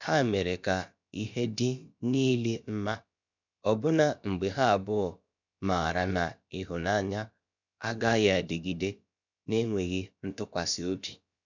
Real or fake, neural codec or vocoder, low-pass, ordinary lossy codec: fake; codec, 16 kHz, about 1 kbps, DyCAST, with the encoder's durations; 7.2 kHz; none